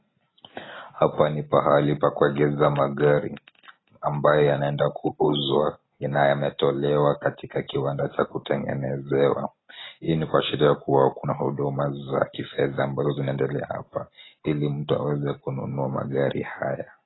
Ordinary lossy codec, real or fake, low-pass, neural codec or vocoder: AAC, 16 kbps; real; 7.2 kHz; none